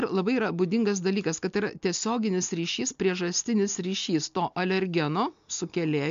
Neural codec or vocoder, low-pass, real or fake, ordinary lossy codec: none; 7.2 kHz; real; MP3, 64 kbps